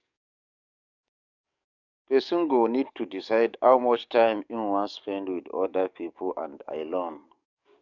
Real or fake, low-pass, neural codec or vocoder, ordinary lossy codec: fake; 7.2 kHz; codec, 44.1 kHz, 7.8 kbps, DAC; none